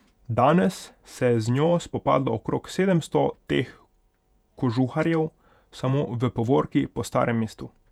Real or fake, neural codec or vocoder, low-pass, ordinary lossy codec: fake; vocoder, 48 kHz, 128 mel bands, Vocos; 19.8 kHz; none